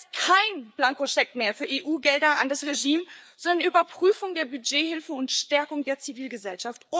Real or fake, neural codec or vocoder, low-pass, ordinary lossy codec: fake; codec, 16 kHz, 4 kbps, FreqCodec, larger model; none; none